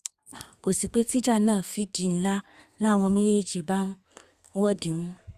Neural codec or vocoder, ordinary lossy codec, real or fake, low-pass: codec, 32 kHz, 1.9 kbps, SNAC; Opus, 64 kbps; fake; 14.4 kHz